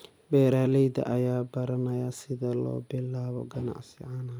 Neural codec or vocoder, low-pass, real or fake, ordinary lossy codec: none; none; real; none